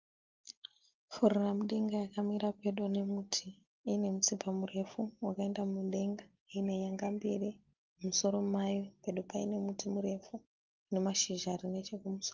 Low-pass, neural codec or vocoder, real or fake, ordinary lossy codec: 7.2 kHz; none; real; Opus, 32 kbps